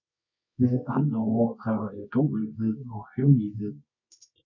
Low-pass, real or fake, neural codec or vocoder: 7.2 kHz; fake; codec, 24 kHz, 0.9 kbps, WavTokenizer, medium music audio release